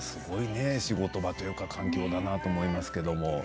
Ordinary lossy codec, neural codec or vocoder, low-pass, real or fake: none; none; none; real